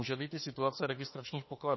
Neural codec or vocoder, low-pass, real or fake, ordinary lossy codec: autoencoder, 48 kHz, 32 numbers a frame, DAC-VAE, trained on Japanese speech; 7.2 kHz; fake; MP3, 24 kbps